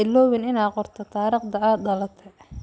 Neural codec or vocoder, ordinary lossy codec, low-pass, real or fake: none; none; none; real